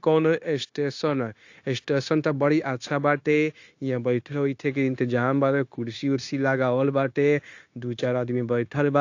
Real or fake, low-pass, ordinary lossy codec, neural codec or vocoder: fake; 7.2 kHz; AAC, 48 kbps; codec, 16 kHz, 0.9 kbps, LongCat-Audio-Codec